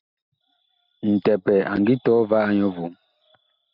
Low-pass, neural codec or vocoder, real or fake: 5.4 kHz; none; real